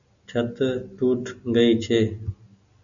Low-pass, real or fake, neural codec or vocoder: 7.2 kHz; real; none